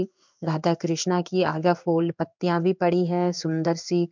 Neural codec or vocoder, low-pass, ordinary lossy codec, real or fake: codec, 16 kHz in and 24 kHz out, 1 kbps, XY-Tokenizer; 7.2 kHz; MP3, 64 kbps; fake